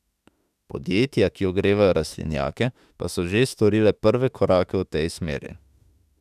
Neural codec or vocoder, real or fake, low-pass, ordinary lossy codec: autoencoder, 48 kHz, 32 numbers a frame, DAC-VAE, trained on Japanese speech; fake; 14.4 kHz; none